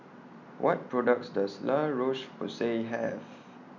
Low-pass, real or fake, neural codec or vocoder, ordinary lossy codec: 7.2 kHz; real; none; none